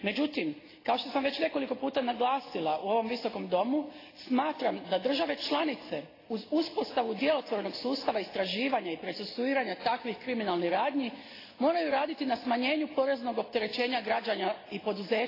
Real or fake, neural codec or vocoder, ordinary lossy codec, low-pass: real; none; AAC, 24 kbps; 5.4 kHz